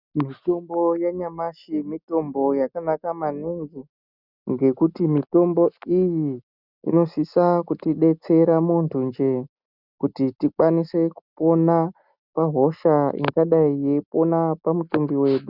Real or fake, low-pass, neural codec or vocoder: real; 5.4 kHz; none